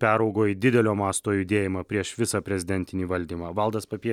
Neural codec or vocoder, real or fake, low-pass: none; real; 19.8 kHz